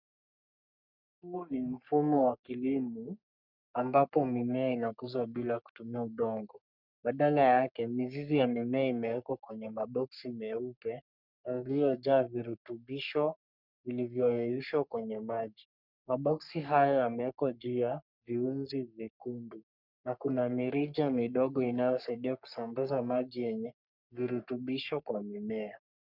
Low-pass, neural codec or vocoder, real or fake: 5.4 kHz; codec, 44.1 kHz, 3.4 kbps, Pupu-Codec; fake